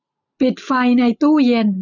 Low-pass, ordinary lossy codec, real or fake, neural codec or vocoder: 7.2 kHz; none; real; none